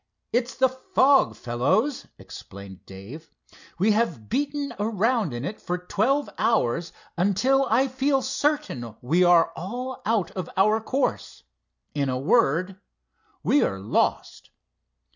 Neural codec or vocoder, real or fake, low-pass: none; real; 7.2 kHz